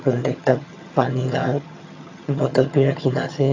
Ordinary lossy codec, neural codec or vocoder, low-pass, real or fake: AAC, 48 kbps; vocoder, 22.05 kHz, 80 mel bands, HiFi-GAN; 7.2 kHz; fake